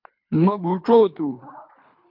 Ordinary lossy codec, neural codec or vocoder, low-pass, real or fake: MP3, 48 kbps; codec, 24 kHz, 3 kbps, HILCodec; 5.4 kHz; fake